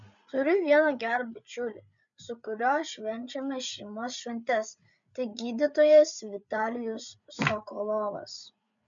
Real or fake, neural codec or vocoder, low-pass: fake; codec, 16 kHz, 8 kbps, FreqCodec, larger model; 7.2 kHz